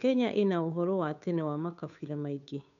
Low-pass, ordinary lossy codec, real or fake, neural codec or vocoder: 7.2 kHz; none; fake; codec, 16 kHz, 2 kbps, FunCodec, trained on Chinese and English, 25 frames a second